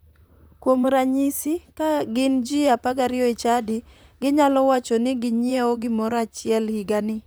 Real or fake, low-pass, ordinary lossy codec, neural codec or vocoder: fake; none; none; vocoder, 44.1 kHz, 128 mel bands, Pupu-Vocoder